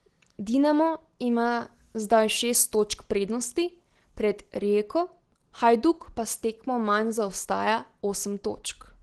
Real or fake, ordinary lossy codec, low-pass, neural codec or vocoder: real; Opus, 16 kbps; 10.8 kHz; none